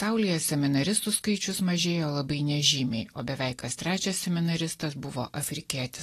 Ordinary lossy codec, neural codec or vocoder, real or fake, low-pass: AAC, 48 kbps; none; real; 14.4 kHz